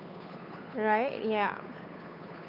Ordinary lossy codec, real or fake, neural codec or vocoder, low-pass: none; fake; codec, 16 kHz, 8 kbps, FunCodec, trained on Chinese and English, 25 frames a second; 5.4 kHz